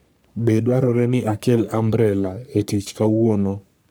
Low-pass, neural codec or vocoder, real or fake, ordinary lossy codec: none; codec, 44.1 kHz, 3.4 kbps, Pupu-Codec; fake; none